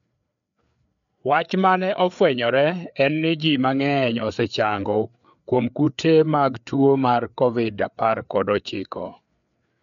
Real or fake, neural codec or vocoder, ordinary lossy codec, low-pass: fake; codec, 16 kHz, 4 kbps, FreqCodec, larger model; none; 7.2 kHz